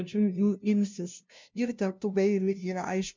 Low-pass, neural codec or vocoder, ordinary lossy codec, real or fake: 7.2 kHz; codec, 16 kHz, 0.5 kbps, FunCodec, trained on LibriTTS, 25 frames a second; MP3, 64 kbps; fake